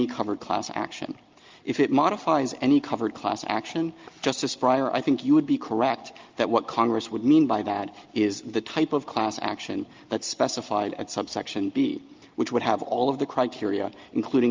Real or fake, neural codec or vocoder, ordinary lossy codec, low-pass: real; none; Opus, 32 kbps; 7.2 kHz